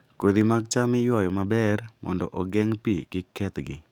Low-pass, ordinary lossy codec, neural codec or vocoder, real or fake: 19.8 kHz; none; codec, 44.1 kHz, 7.8 kbps, DAC; fake